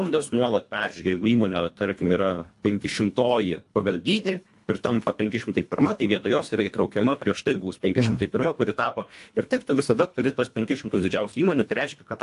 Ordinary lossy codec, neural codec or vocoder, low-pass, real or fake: AAC, 64 kbps; codec, 24 kHz, 1.5 kbps, HILCodec; 10.8 kHz; fake